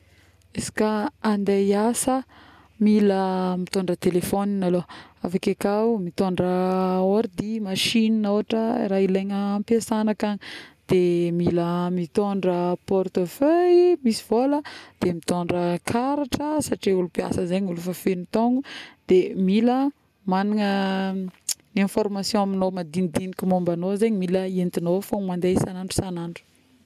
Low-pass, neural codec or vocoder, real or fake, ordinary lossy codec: 14.4 kHz; none; real; none